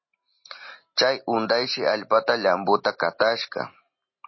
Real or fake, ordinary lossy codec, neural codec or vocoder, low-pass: real; MP3, 24 kbps; none; 7.2 kHz